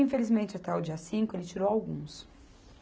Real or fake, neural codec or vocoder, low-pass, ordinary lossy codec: real; none; none; none